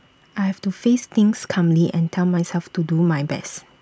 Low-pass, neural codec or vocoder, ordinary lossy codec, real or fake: none; none; none; real